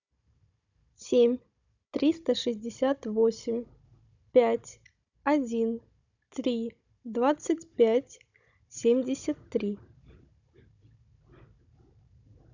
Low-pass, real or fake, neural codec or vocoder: 7.2 kHz; fake; codec, 16 kHz, 16 kbps, FunCodec, trained on Chinese and English, 50 frames a second